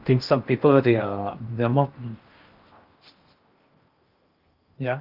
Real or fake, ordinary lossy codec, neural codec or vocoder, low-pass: fake; Opus, 24 kbps; codec, 16 kHz in and 24 kHz out, 0.8 kbps, FocalCodec, streaming, 65536 codes; 5.4 kHz